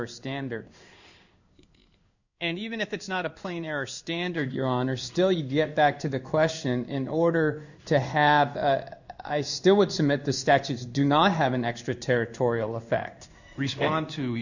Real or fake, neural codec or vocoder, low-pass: fake; codec, 16 kHz in and 24 kHz out, 1 kbps, XY-Tokenizer; 7.2 kHz